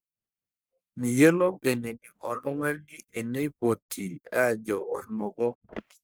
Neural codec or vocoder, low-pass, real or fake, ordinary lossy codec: codec, 44.1 kHz, 1.7 kbps, Pupu-Codec; none; fake; none